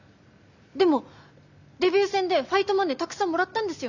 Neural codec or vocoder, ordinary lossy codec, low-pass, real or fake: none; Opus, 64 kbps; 7.2 kHz; real